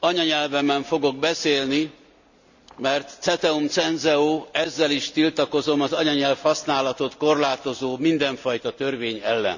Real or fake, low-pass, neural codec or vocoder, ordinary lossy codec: real; 7.2 kHz; none; none